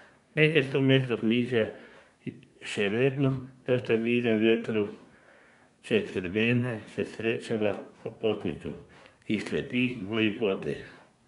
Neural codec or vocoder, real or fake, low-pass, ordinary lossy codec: codec, 24 kHz, 1 kbps, SNAC; fake; 10.8 kHz; none